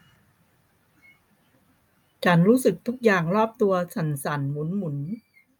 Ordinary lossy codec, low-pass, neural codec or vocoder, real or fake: none; none; none; real